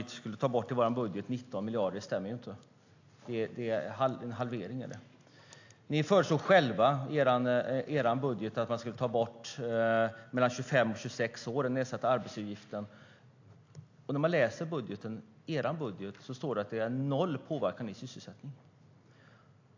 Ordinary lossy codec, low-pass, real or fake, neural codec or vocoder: AAC, 48 kbps; 7.2 kHz; real; none